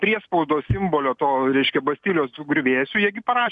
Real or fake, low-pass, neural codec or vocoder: real; 10.8 kHz; none